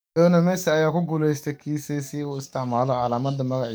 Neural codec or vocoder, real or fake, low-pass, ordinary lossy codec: codec, 44.1 kHz, 7.8 kbps, DAC; fake; none; none